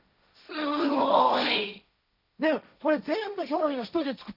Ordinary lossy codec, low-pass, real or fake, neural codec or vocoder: none; 5.4 kHz; fake; codec, 16 kHz, 1.1 kbps, Voila-Tokenizer